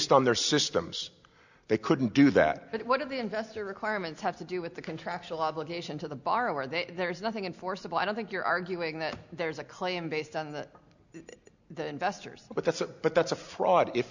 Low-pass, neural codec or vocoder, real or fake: 7.2 kHz; none; real